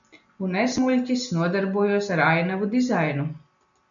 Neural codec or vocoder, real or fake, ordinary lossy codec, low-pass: none; real; MP3, 96 kbps; 7.2 kHz